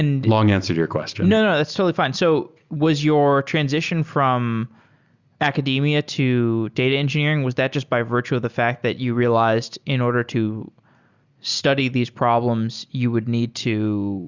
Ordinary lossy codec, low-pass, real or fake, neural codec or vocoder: Opus, 64 kbps; 7.2 kHz; real; none